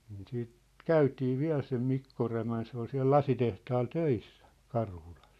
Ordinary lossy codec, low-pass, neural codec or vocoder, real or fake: none; 14.4 kHz; none; real